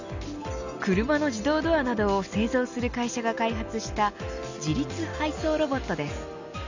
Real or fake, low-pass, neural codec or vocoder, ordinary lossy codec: real; 7.2 kHz; none; none